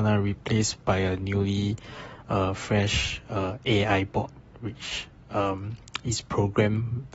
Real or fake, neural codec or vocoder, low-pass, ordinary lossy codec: real; none; 19.8 kHz; AAC, 24 kbps